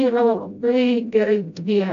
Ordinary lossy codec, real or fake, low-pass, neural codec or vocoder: MP3, 64 kbps; fake; 7.2 kHz; codec, 16 kHz, 0.5 kbps, FreqCodec, smaller model